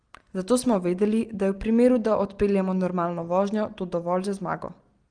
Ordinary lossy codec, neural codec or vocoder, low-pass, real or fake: Opus, 24 kbps; none; 9.9 kHz; real